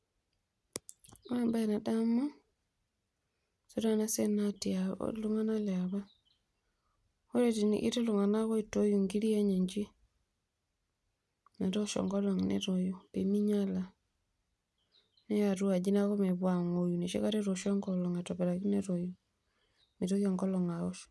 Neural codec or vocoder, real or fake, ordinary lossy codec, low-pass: none; real; none; none